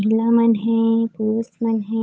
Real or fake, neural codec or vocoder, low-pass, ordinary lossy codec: fake; codec, 16 kHz, 8 kbps, FunCodec, trained on Chinese and English, 25 frames a second; none; none